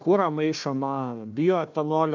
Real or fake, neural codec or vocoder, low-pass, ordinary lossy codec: fake; codec, 16 kHz, 1 kbps, FunCodec, trained on Chinese and English, 50 frames a second; 7.2 kHz; MP3, 64 kbps